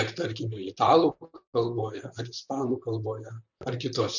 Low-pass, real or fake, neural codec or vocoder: 7.2 kHz; fake; vocoder, 44.1 kHz, 128 mel bands, Pupu-Vocoder